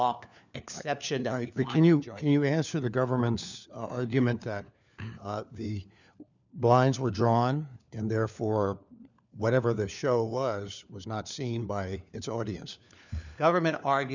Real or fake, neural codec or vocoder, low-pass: fake; codec, 16 kHz, 4 kbps, FunCodec, trained on LibriTTS, 50 frames a second; 7.2 kHz